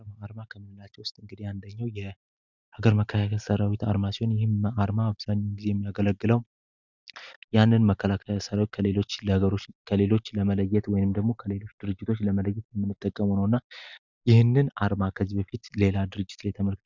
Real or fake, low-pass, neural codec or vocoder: real; 7.2 kHz; none